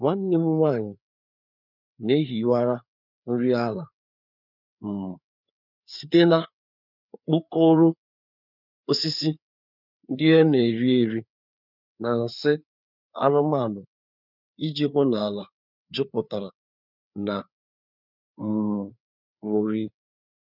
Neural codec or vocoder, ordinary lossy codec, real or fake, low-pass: codec, 16 kHz, 4 kbps, FreqCodec, larger model; none; fake; 5.4 kHz